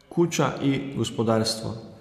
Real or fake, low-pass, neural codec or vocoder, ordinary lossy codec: real; 14.4 kHz; none; none